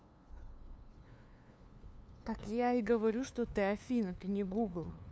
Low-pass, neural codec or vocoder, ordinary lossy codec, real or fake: none; codec, 16 kHz, 2 kbps, FunCodec, trained on LibriTTS, 25 frames a second; none; fake